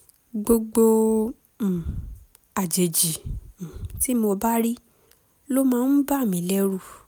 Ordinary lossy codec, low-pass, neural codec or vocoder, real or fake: none; none; none; real